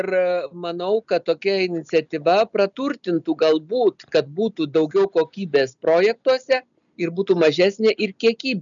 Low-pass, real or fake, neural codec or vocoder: 7.2 kHz; real; none